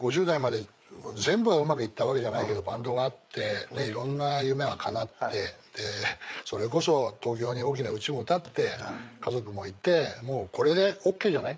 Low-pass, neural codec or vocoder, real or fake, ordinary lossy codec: none; codec, 16 kHz, 4 kbps, FreqCodec, larger model; fake; none